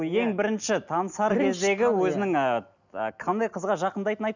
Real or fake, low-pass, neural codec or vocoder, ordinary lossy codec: real; 7.2 kHz; none; none